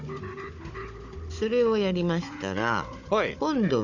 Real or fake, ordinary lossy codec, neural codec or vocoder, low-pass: fake; none; codec, 16 kHz, 16 kbps, FunCodec, trained on Chinese and English, 50 frames a second; 7.2 kHz